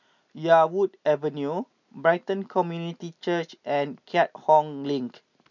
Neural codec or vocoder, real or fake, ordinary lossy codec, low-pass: none; real; none; 7.2 kHz